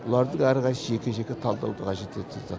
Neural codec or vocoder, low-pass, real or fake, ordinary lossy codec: none; none; real; none